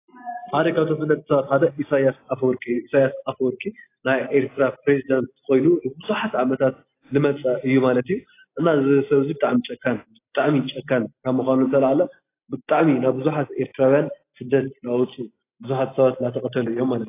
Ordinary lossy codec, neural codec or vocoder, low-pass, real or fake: AAC, 24 kbps; none; 3.6 kHz; real